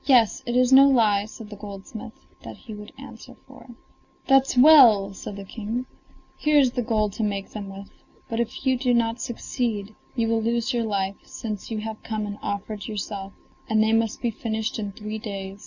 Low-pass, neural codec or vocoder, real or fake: 7.2 kHz; none; real